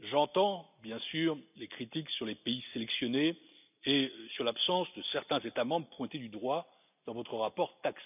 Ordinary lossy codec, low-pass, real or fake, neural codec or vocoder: none; 3.6 kHz; real; none